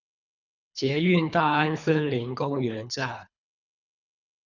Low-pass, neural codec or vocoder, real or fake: 7.2 kHz; codec, 24 kHz, 3 kbps, HILCodec; fake